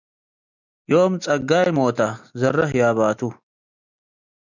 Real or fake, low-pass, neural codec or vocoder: real; 7.2 kHz; none